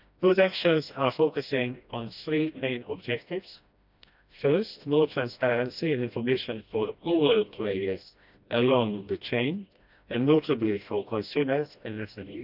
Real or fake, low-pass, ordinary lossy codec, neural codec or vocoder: fake; 5.4 kHz; none; codec, 16 kHz, 1 kbps, FreqCodec, smaller model